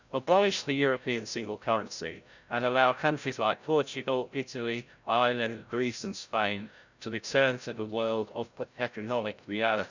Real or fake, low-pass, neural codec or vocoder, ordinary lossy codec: fake; 7.2 kHz; codec, 16 kHz, 0.5 kbps, FreqCodec, larger model; none